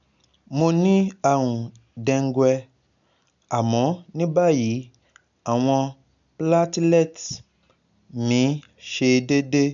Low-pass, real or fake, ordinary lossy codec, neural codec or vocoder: 7.2 kHz; real; none; none